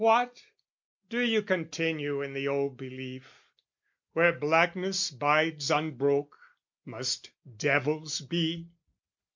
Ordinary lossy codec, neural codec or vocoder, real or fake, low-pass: MP3, 64 kbps; none; real; 7.2 kHz